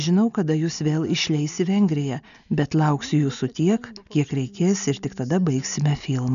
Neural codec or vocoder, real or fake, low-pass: none; real; 7.2 kHz